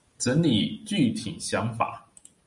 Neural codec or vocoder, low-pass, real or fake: none; 10.8 kHz; real